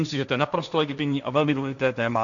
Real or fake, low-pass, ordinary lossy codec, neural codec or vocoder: fake; 7.2 kHz; MP3, 96 kbps; codec, 16 kHz, 1.1 kbps, Voila-Tokenizer